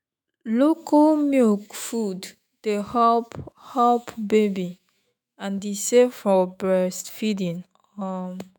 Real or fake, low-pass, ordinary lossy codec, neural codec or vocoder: fake; none; none; autoencoder, 48 kHz, 128 numbers a frame, DAC-VAE, trained on Japanese speech